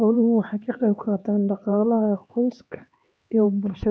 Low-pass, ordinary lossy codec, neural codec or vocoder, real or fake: none; none; codec, 16 kHz, 2 kbps, X-Codec, HuBERT features, trained on LibriSpeech; fake